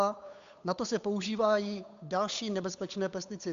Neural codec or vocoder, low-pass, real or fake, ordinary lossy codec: codec, 16 kHz, 8 kbps, FunCodec, trained on Chinese and English, 25 frames a second; 7.2 kHz; fake; AAC, 64 kbps